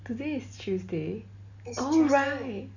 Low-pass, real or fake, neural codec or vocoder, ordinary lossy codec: 7.2 kHz; real; none; none